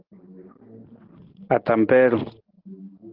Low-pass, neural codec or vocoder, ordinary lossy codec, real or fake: 5.4 kHz; none; Opus, 16 kbps; real